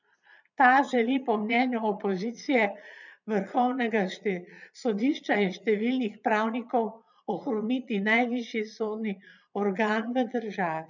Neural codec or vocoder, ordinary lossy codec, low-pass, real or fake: vocoder, 22.05 kHz, 80 mel bands, Vocos; none; 7.2 kHz; fake